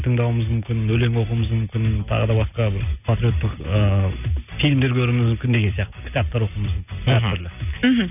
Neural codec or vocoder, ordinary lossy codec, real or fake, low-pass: none; none; real; 3.6 kHz